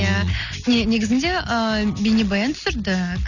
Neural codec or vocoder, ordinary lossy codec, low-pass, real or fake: none; none; 7.2 kHz; real